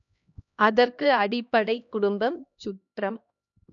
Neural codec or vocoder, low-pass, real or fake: codec, 16 kHz, 1 kbps, X-Codec, HuBERT features, trained on LibriSpeech; 7.2 kHz; fake